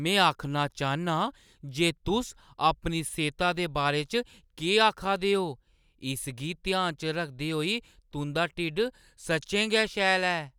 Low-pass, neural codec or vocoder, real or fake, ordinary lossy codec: 19.8 kHz; none; real; none